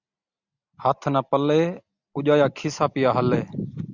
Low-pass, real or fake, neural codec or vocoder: 7.2 kHz; real; none